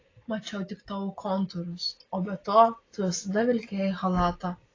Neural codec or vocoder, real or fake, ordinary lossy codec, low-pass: none; real; AAC, 32 kbps; 7.2 kHz